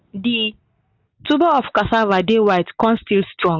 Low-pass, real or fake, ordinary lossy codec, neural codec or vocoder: 7.2 kHz; real; none; none